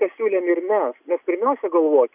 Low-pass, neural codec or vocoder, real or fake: 3.6 kHz; none; real